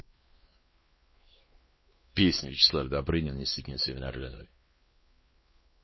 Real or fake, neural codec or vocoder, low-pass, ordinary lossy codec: fake; codec, 16 kHz, 2 kbps, X-Codec, WavLM features, trained on Multilingual LibriSpeech; 7.2 kHz; MP3, 24 kbps